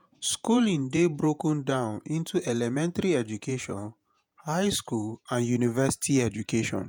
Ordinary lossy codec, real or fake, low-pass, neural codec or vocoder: none; fake; none; vocoder, 48 kHz, 128 mel bands, Vocos